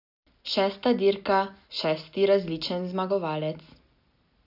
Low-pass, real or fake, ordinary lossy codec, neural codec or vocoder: 5.4 kHz; real; none; none